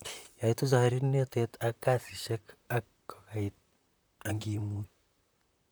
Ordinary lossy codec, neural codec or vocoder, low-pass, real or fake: none; vocoder, 44.1 kHz, 128 mel bands, Pupu-Vocoder; none; fake